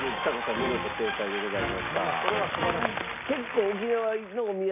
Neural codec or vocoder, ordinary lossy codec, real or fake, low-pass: none; none; real; 3.6 kHz